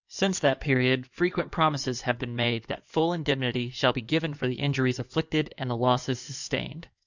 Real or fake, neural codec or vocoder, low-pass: fake; codec, 16 kHz in and 24 kHz out, 2.2 kbps, FireRedTTS-2 codec; 7.2 kHz